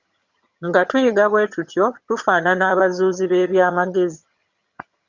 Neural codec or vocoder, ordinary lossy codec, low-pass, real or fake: vocoder, 22.05 kHz, 80 mel bands, HiFi-GAN; Opus, 64 kbps; 7.2 kHz; fake